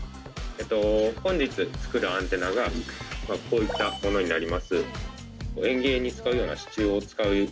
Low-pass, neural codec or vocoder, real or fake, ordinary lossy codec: none; none; real; none